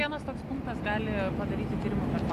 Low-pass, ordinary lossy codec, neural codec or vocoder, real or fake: 14.4 kHz; AAC, 64 kbps; none; real